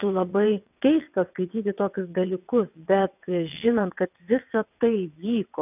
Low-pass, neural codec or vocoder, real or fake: 3.6 kHz; vocoder, 22.05 kHz, 80 mel bands, WaveNeXt; fake